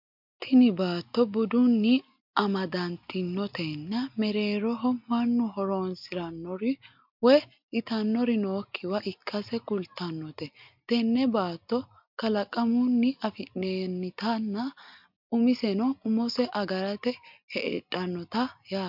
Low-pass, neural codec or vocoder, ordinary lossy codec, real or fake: 5.4 kHz; none; MP3, 48 kbps; real